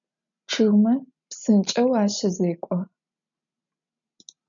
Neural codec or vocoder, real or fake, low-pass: none; real; 7.2 kHz